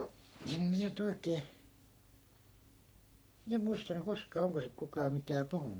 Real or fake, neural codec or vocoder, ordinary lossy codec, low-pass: fake; codec, 44.1 kHz, 3.4 kbps, Pupu-Codec; none; none